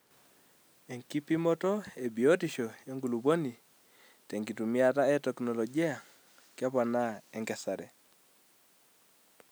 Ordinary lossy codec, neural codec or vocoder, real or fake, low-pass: none; none; real; none